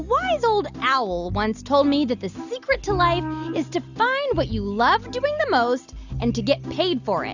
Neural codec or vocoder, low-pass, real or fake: none; 7.2 kHz; real